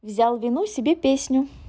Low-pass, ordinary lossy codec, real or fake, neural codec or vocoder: none; none; real; none